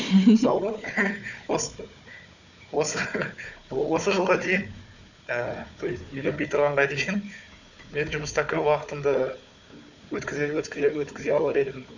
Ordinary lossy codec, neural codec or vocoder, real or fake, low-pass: none; codec, 16 kHz, 4 kbps, FunCodec, trained on Chinese and English, 50 frames a second; fake; 7.2 kHz